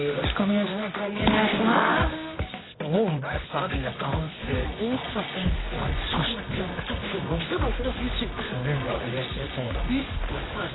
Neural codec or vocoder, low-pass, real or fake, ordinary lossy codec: codec, 24 kHz, 0.9 kbps, WavTokenizer, medium music audio release; 7.2 kHz; fake; AAC, 16 kbps